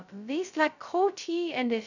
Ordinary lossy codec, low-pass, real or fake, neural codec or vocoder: none; 7.2 kHz; fake; codec, 16 kHz, 0.2 kbps, FocalCodec